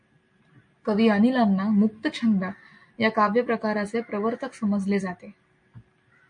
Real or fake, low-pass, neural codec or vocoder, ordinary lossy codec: real; 9.9 kHz; none; MP3, 48 kbps